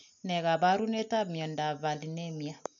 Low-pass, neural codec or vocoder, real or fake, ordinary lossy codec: 7.2 kHz; none; real; none